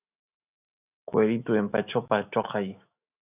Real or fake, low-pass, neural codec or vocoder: fake; 3.6 kHz; autoencoder, 48 kHz, 128 numbers a frame, DAC-VAE, trained on Japanese speech